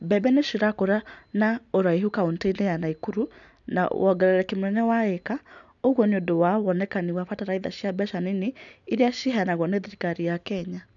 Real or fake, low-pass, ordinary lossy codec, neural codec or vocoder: real; 7.2 kHz; none; none